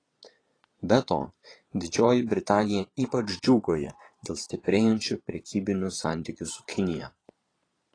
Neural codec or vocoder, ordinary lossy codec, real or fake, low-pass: vocoder, 22.05 kHz, 80 mel bands, Vocos; AAC, 32 kbps; fake; 9.9 kHz